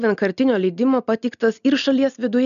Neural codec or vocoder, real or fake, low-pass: none; real; 7.2 kHz